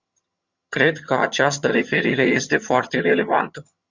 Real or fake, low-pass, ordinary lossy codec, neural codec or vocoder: fake; 7.2 kHz; Opus, 64 kbps; vocoder, 22.05 kHz, 80 mel bands, HiFi-GAN